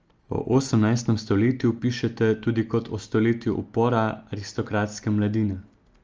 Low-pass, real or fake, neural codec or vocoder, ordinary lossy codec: 7.2 kHz; real; none; Opus, 24 kbps